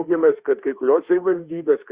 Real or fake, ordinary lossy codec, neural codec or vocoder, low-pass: fake; Opus, 64 kbps; autoencoder, 48 kHz, 32 numbers a frame, DAC-VAE, trained on Japanese speech; 3.6 kHz